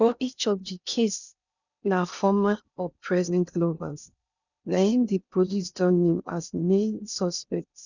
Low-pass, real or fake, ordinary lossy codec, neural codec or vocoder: 7.2 kHz; fake; none; codec, 16 kHz in and 24 kHz out, 0.6 kbps, FocalCodec, streaming, 2048 codes